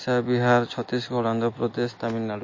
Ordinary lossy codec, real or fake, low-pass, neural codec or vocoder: MP3, 32 kbps; real; 7.2 kHz; none